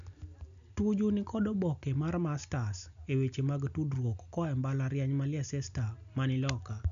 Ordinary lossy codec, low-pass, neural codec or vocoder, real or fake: MP3, 96 kbps; 7.2 kHz; none; real